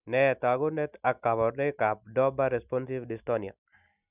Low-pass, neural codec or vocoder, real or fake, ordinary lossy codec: 3.6 kHz; none; real; none